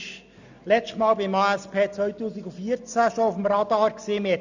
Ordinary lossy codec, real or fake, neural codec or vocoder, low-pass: none; real; none; 7.2 kHz